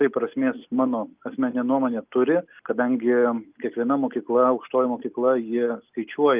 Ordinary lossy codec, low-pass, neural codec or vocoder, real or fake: Opus, 24 kbps; 3.6 kHz; none; real